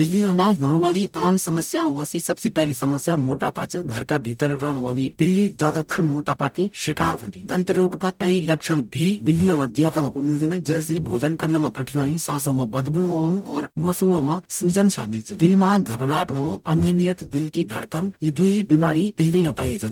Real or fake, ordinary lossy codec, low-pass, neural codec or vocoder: fake; none; 19.8 kHz; codec, 44.1 kHz, 0.9 kbps, DAC